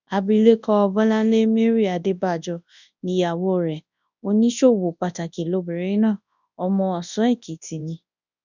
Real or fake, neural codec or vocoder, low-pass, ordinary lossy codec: fake; codec, 24 kHz, 0.9 kbps, WavTokenizer, large speech release; 7.2 kHz; none